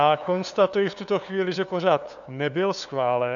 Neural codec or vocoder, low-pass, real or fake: codec, 16 kHz, 2 kbps, FunCodec, trained on LibriTTS, 25 frames a second; 7.2 kHz; fake